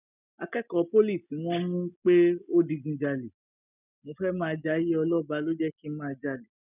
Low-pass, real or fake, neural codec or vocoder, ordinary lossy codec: 3.6 kHz; real; none; none